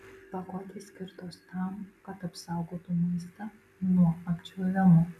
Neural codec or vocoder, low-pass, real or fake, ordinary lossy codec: none; 14.4 kHz; real; MP3, 96 kbps